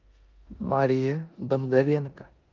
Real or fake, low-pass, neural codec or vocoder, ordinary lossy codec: fake; 7.2 kHz; codec, 16 kHz in and 24 kHz out, 0.9 kbps, LongCat-Audio-Codec, four codebook decoder; Opus, 32 kbps